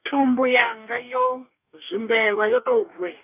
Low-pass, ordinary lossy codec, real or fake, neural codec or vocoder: 3.6 kHz; none; fake; codec, 44.1 kHz, 2.6 kbps, DAC